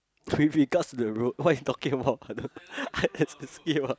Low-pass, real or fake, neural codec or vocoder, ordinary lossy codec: none; real; none; none